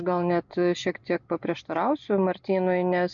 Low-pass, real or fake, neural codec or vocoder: 7.2 kHz; real; none